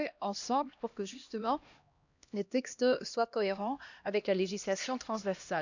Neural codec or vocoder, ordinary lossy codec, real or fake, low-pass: codec, 16 kHz, 1 kbps, X-Codec, HuBERT features, trained on LibriSpeech; none; fake; 7.2 kHz